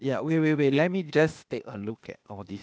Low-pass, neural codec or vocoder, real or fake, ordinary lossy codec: none; codec, 16 kHz, 0.8 kbps, ZipCodec; fake; none